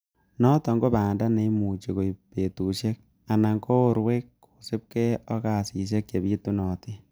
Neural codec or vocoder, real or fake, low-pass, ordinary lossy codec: none; real; none; none